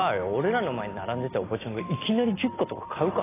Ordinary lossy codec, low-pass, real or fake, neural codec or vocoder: AAC, 16 kbps; 3.6 kHz; real; none